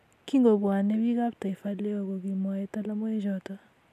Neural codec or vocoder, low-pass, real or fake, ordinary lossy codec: none; 14.4 kHz; real; none